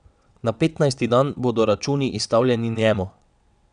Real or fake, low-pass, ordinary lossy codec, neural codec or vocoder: fake; 9.9 kHz; none; vocoder, 22.05 kHz, 80 mel bands, WaveNeXt